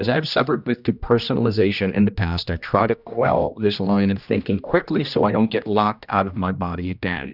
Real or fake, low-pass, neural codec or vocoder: fake; 5.4 kHz; codec, 16 kHz, 1 kbps, X-Codec, HuBERT features, trained on general audio